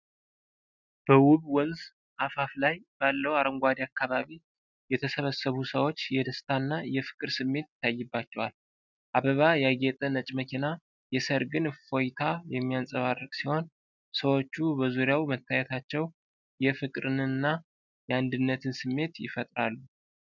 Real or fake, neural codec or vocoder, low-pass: real; none; 7.2 kHz